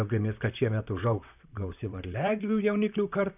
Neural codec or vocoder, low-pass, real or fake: vocoder, 44.1 kHz, 128 mel bands, Pupu-Vocoder; 3.6 kHz; fake